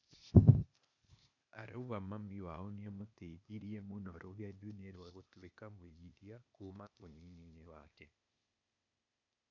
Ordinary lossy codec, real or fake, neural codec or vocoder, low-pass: none; fake; codec, 16 kHz, 0.8 kbps, ZipCodec; 7.2 kHz